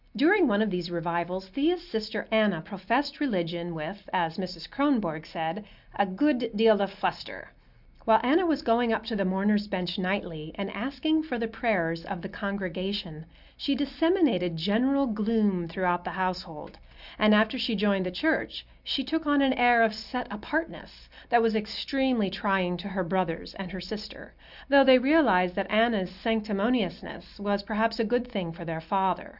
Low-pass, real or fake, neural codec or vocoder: 5.4 kHz; real; none